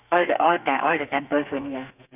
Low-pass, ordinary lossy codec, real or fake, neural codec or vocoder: 3.6 kHz; none; fake; codec, 32 kHz, 1.9 kbps, SNAC